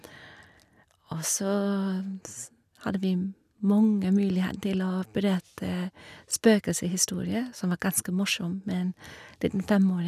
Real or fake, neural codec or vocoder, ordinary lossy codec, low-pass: real; none; none; 14.4 kHz